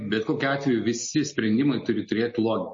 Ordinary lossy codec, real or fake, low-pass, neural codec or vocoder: MP3, 32 kbps; real; 7.2 kHz; none